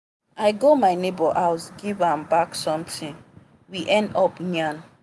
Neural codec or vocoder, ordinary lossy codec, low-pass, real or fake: none; none; none; real